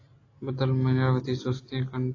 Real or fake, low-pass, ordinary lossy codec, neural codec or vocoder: real; 7.2 kHz; AAC, 32 kbps; none